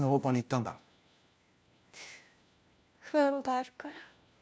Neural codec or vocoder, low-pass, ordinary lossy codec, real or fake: codec, 16 kHz, 1 kbps, FunCodec, trained on LibriTTS, 50 frames a second; none; none; fake